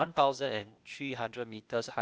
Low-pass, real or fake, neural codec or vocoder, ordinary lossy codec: none; fake; codec, 16 kHz, 0.8 kbps, ZipCodec; none